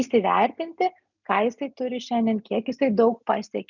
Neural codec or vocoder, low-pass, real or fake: none; 7.2 kHz; real